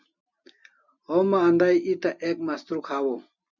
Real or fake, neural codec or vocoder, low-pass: real; none; 7.2 kHz